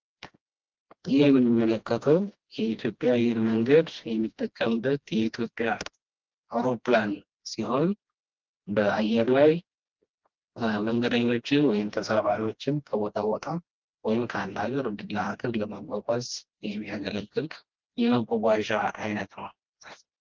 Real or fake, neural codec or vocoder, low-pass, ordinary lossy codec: fake; codec, 16 kHz, 1 kbps, FreqCodec, smaller model; 7.2 kHz; Opus, 32 kbps